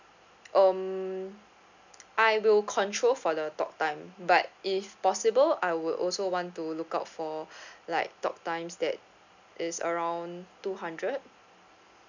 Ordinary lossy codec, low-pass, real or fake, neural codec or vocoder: none; 7.2 kHz; real; none